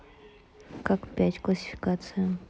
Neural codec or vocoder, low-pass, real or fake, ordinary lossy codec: none; none; real; none